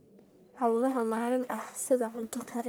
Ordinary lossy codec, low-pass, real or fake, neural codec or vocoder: none; none; fake; codec, 44.1 kHz, 1.7 kbps, Pupu-Codec